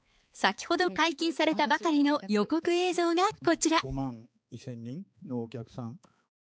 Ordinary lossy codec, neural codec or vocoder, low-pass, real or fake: none; codec, 16 kHz, 4 kbps, X-Codec, HuBERT features, trained on balanced general audio; none; fake